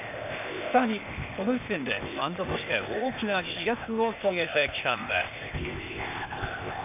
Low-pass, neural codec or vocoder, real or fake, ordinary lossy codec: 3.6 kHz; codec, 16 kHz, 0.8 kbps, ZipCodec; fake; none